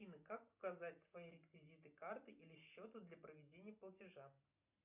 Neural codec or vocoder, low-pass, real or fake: none; 3.6 kHz; real